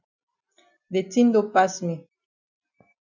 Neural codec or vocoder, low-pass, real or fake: none; 7.2 kHz; real